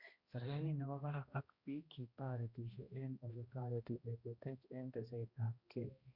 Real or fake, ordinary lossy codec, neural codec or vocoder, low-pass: fake; Opus, 64 kbps; codec, 16 kHz, 1 kbps, X-Codec, HuBERT features, trained on balanced general audio; 5.4 kHz